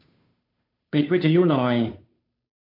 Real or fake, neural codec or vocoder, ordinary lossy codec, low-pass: fake; codec, 16 kHz, 2 kbps, FunCodec, trained on Chinese and English, 25 frames a second; MP3, 48 kbps; 5.4 kHz